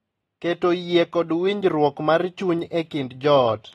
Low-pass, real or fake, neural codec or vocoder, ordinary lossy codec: 19.8 kHz; real; none; AAC, 32 kbps